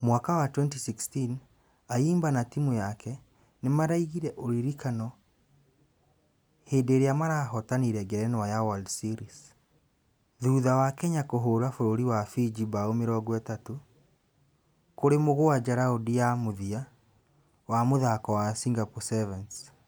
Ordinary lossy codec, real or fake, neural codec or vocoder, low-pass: none; real; none; none